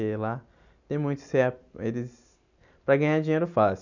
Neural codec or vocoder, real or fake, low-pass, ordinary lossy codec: none; real; 7.2 kHz; none